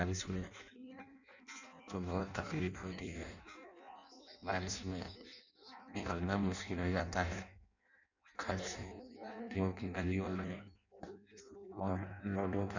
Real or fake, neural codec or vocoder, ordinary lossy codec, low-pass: fake; codec, 16 kHz in and 24 kHz out, 0.6 kbps, FireRedTTS-2 codec; none; 7.2 kHz